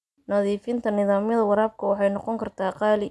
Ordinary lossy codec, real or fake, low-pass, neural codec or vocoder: none; real; none; none